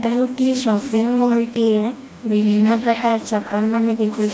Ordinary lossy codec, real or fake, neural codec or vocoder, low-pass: none; fake; codec, 16 kHz, 1 kbps, FreqCodec, smaller model; none